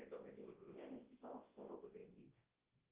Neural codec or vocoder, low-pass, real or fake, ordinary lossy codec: codec, 24 kHz, 0.9 kbps, WavTokenizer, large speech release; 3.6 kHz; fake; Opus, 16 kbps